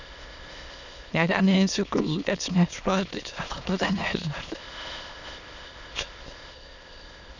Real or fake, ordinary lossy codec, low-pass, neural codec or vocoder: fake; none; 7.2 kHz; autoencoder, 22.05 kHz, a latent of 192 numbers a frame, VITS, trained on many speakers